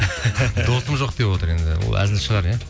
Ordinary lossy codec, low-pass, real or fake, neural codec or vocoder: none; none; real; none